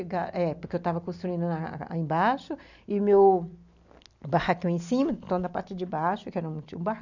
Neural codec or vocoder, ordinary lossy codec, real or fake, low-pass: none; none; real; 7.2 kHz